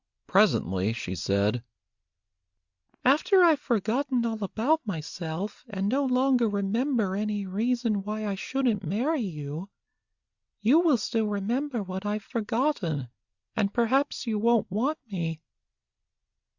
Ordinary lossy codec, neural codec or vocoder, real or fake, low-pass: Opus, 64 kbps; none; real; 7.2 kHz